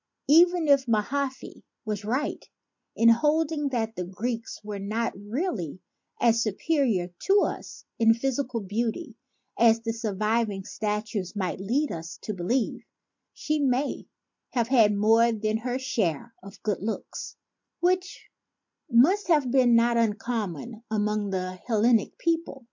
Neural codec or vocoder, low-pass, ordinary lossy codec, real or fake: none; 7.2 kHz; MP3, 48 kbps; real